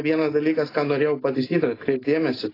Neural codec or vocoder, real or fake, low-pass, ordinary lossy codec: none; real; 5.4 kHz; AAC, 24 kbps